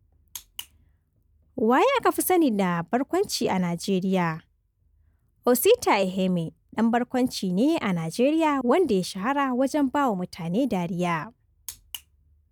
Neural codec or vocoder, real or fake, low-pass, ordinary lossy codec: none; real; none; none